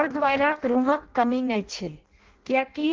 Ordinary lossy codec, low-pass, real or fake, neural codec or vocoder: Opus, 16 kbps; 7.2 kHz; fake; codec, 16 kHz in and 24 kHz out, 0.6 kbps, FireRedTTS-2 codec